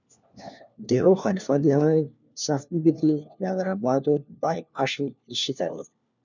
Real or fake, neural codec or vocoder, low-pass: fake; codec, 16 kHz, 1 kbps, FunCodec, trained on LibriTTS, 50 frames a second; 7.2 kHz